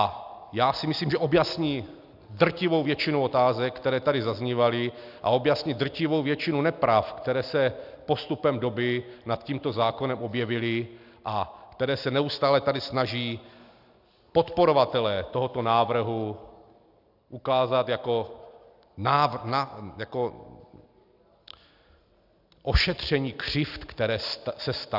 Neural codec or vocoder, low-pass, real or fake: none; 5.4 kHz; real